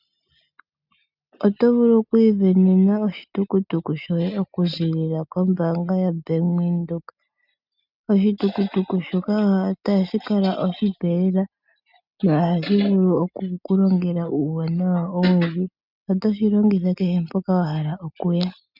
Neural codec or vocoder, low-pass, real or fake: none; 5.4 kHz; real